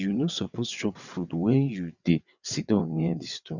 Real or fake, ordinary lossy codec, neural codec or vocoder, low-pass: fake; none; vocoder, 22.05 kHz, 80 mel bands, WaveNeXt; 7.2 kHz